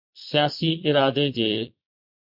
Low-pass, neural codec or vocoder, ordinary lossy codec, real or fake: 5.4 kHz; vocoder, 22.05 kHz, 80 mel bands, Vocos; MP3, 48 kbps; fake